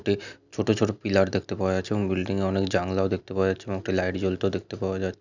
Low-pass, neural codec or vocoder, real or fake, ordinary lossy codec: 7.2 kHz; none; real; none